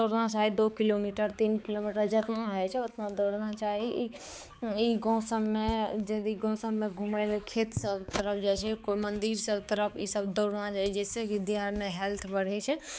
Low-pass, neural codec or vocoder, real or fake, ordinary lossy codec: none; codec, 16 kHz, 4 kbps, X-Codec, HuBERT features, trained on balanced general audio; fake; none